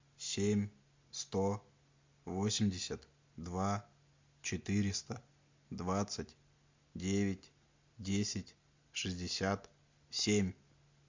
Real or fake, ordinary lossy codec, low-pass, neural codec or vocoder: real; MP3, 64 kbps; 7.2 kHz; none